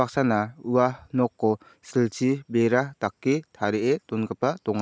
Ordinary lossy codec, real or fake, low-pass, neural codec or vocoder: none; real; none; none